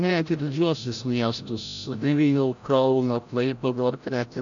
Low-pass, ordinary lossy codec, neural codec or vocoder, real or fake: 7.2 kHz; AAC, 64 kbps; codec, 16 kHz, 0.5 kbps, FreqCodec, larger model; fake